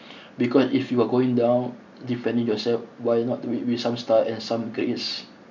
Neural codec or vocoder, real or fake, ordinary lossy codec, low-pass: none; real; none; 7.2 kHz